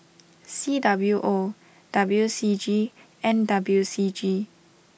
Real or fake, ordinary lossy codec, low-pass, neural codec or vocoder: real; none; none; none